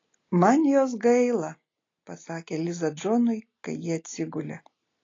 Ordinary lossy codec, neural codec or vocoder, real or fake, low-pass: AAC, 32 kbps; none; real; 7.2 kHz